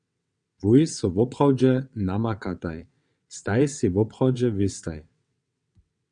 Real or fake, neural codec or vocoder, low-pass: fake; vocoder, 22.05 kHz, 80 mel bands, WaveNeXt; 9.9 kHz